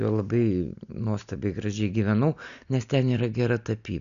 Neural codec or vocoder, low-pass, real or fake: none; 7.2 kHz; real